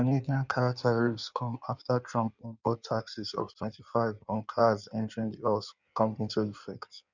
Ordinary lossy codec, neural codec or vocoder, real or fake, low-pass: none; codec, 16 kHz in and 24 kHz out, 1.1 kbps, FireRedTTS-2 codec; fake; 7.2 kHz